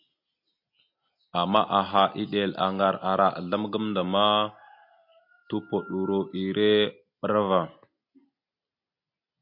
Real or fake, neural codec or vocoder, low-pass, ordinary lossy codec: real; none; 5.4 kHz; MP3, 32 kbps